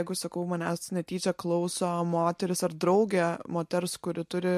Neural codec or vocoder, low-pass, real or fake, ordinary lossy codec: none; 14.4 kHz; real; MP3, 64 kbps